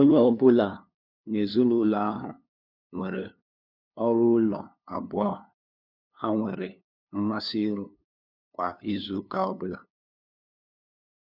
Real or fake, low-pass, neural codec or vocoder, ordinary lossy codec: fake; 5.4 kHz; codec, 16 kHz, 2 kbps, FunCodec, trained on LibriTTS, 25 frames a second; none